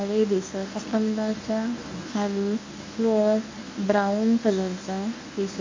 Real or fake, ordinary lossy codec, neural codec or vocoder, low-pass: fake; MP3, 48 kbps; codec, 24 kHz, 0.9 kbps, WavTokenizer, medium speech release version 1; 7.2 kHz